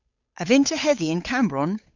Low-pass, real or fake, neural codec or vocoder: 7.2 kHz; fake; codec, 16 kHz, 8 kbps, FunCodec, trained on Chinese and English, 25 frames a second